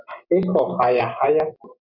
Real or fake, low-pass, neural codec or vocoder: real; 5.4 kHz; none